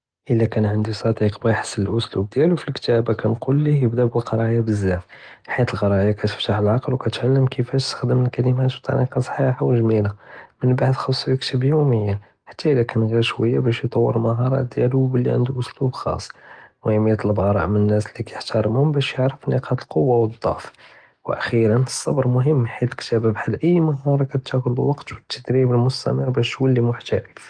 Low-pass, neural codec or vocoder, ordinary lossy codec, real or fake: 9.9 kHz; none; Opus, 24 kbps; real